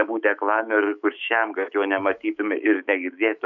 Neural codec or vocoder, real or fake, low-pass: autoencoder, 48 kHz, 128 numbers a frame, DAC-VAE, trained on Japanese speech; fake; 7.2 kHz